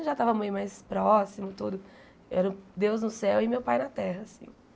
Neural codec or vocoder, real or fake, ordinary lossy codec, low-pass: none; real; none; none